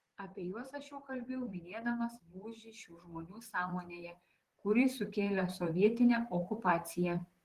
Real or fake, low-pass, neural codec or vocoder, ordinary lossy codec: fake; 14.4 kHz; vocoder, 44.1 kHz, 128 mel bands, Pupu-Vocoder; Opus, 16 kbps